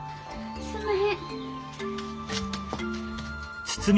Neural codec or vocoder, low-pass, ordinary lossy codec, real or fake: none; none; none; real